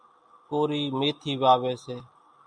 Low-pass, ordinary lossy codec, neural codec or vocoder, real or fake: 9.9 kHz; MP3, 96 kbps; none; real